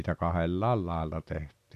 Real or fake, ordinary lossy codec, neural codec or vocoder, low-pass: real; AAC, 96 kbps; none; 14.4 kHz